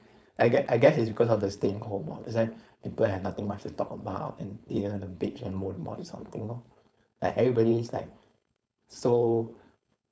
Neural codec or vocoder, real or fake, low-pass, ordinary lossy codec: codec, 16 kHz, 4.8 kbps, FACodec; fake; none; none